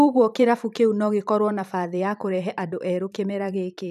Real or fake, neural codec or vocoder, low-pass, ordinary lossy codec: fake; vocoder, 44.1 kHz, 128 mel bands every 512 samples, BigVGAN v2; 14.4 kHz; none